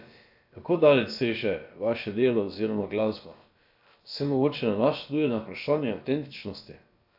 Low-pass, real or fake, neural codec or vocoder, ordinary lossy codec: 5.4 kHz; fake; codec, 16 kHz, about 1 kbps, DyCAST, with the encoder's durations; AAC, 48 kbps